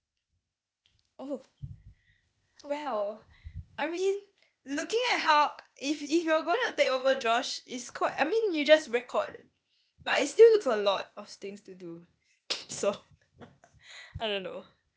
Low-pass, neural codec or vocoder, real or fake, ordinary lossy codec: none; codec, 16 kHz, 0.8 kbps, ZipCodec; fake; none